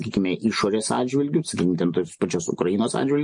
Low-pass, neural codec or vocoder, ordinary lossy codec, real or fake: 9.9 kHz; none; MP3, 48 kbps; real